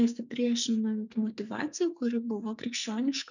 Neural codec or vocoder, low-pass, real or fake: codec, 44.1 kHz, 2.6 kbps, SNAC; 7.2 kHz; fake